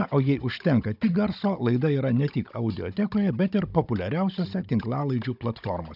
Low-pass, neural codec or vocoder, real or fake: 5.4 kHz; codec, 16 kHz, 16 kbps, FunCodec, trained on Chinese and English, 50 frames a second; fake